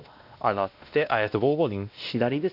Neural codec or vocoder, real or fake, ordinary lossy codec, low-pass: codec, 16 kHz, 1 kbps, X-Codec, WavLM features, trained on Multilingual LibriSpeech; fake; none; 5.4 kHz